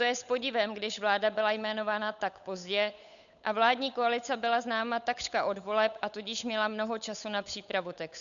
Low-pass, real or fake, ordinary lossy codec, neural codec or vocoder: 7.2 kHz; fake; MP3, 96 kbps; codec, 16 kHz, 8 kbps, FunCodec, trained on Chinese and English, 25 frames a second